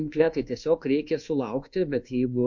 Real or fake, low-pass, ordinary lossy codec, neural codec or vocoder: fake; 7.2 kHz; MP3, 48 kbps; codec, 24 kHz, 1.2 kbps, DualCodec